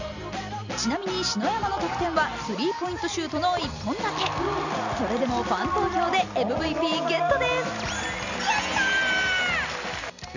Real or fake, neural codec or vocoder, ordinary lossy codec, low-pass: real; none; none; 7.2 kHz